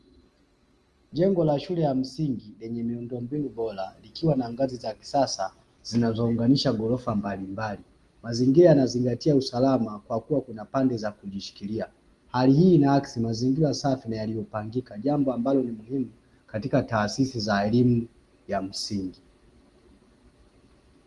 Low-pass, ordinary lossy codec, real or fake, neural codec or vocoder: 10.8 kHz; Opus, 24 kbps; real; none